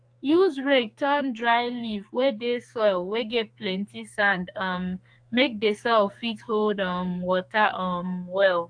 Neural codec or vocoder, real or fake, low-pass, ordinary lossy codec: codec, 44.1 kHz, 2.6 kbps, SNAC; fake; 9.9 kHz; AAC, 64 kbps